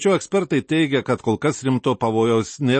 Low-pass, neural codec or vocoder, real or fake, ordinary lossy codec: 9.9 kHz; none; real; MP3, 32 kbps